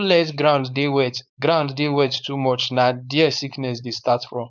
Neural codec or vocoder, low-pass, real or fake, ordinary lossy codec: codec, 16 kHz, 4.8 kbps, FACodec; 7.2 kHz; fake; none